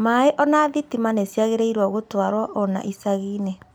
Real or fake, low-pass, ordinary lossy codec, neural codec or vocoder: real; none; none; none